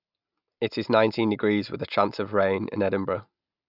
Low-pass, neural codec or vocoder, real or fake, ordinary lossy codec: 5.4 kHz; none; real; none